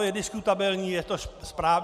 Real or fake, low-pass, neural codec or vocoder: real; 14.4 kHz; none